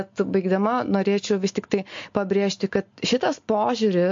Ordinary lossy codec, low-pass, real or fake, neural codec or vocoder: MP3, 48 kbps; 7.2 kHz; real; none